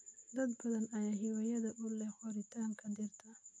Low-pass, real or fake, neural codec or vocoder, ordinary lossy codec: 9.9 kHz; real; none; MP3, 64 kbps